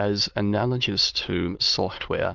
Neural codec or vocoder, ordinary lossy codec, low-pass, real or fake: autoencoder, 22.05 kHz, a latent of 192 numbers a frame, VITS, trained on many speakers; Opus, 32 kbps; 7.2 kHz; fake